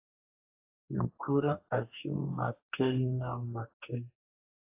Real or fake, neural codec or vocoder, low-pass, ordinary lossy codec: fake; codec, 44.1 kHz, 2.6 kbps, DAC; 3.6 kHz; AAC, 24 kbps